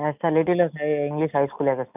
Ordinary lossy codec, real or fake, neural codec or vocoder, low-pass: none; real; none; 3.6 kHz